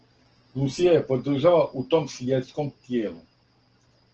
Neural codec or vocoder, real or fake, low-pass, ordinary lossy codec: none; real; 7.2 kHz; Opus, 16 kbps